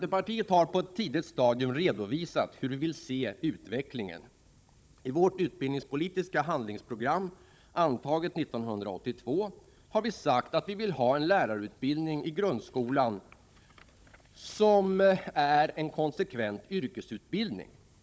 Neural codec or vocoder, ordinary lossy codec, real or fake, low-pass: codec, 16 kHz, 16 kbps, FunCodec, trained on Chinese and English, 50 frames a second; none; fake; none